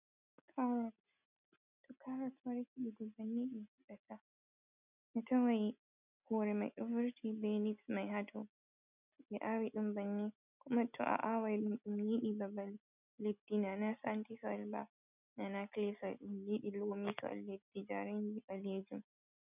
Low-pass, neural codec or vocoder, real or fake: 3.6 kHz; none; real